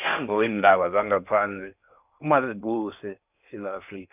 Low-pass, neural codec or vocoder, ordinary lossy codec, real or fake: 3.6 kHz; codec, 16 kHz in and 24 kHz out, 0.6 kbps, FocalCodec, streaming, 4096 codes; none; fake